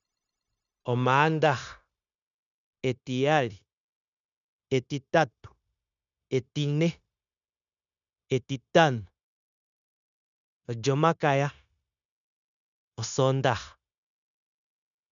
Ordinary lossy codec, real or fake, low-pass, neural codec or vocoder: none; fake; 7.2 kHz; codec, 16 kHz, 0.9 kbps, LongCat-Audio-Codec